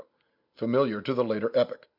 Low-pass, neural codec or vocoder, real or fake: 5.4 kHz; none; real